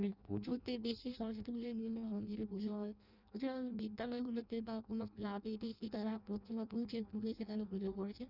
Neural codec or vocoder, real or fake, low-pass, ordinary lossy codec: codec, 16 kHz in and 24 kHz out, 0.6 kbps, FireRedTTS-2 codec; fake; 5.4 kHz; none